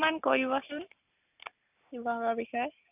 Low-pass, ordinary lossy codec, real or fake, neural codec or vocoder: 3.6 kHz; none; real; none